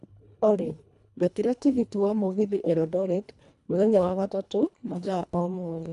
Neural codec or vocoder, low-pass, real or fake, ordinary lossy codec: codec, 24 kHz, 1.5 kbps, HILCodec; 10.8 kHz; fake; none